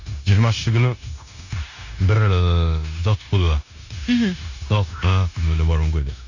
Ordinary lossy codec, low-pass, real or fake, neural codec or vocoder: none; 7.2 kHz; fake; codec, 16 kHz, 0.9 kbps, LongCat-Audio-Codec